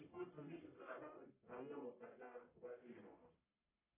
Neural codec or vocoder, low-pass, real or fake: codec, 44.1 kHz, 1.7 kbps, Pupu-Codec; 3.6 kHz; fake